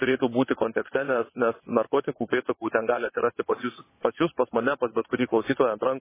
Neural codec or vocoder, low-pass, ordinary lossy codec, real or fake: vocoder, 22.05 kHz, 80 mel bands, WaveNeXt; 3.6 kHz; MP3, 16 kbps; fake